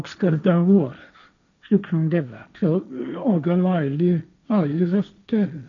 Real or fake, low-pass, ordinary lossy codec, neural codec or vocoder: fake; 7.2 kHz; none; codec, 16 kHz, 1.1 kbps, Voila-Tokenizer